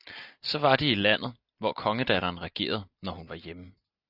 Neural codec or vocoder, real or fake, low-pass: none; real; 5.4 kHz